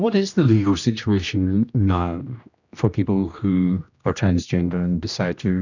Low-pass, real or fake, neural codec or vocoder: 7.2 kHz; fake; codec, 16 kHz, 1 kbps, X-Codec, HuBERT features, trained on general audio